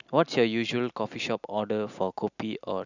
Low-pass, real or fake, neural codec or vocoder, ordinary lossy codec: 7.2 kHz; real; none; none